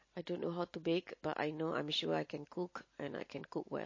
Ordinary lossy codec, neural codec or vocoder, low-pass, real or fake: MP3, 32 kbps; none; 7.2 kHz; real